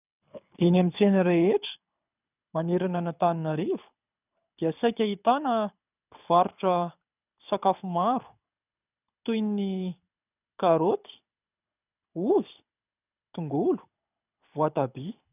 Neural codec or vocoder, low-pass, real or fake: codec, 24 kHz, 6 kbps, HILCodec; 3.6 kHz; fake